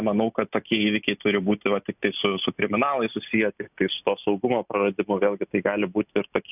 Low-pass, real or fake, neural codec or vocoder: 3.6 kHz; real; none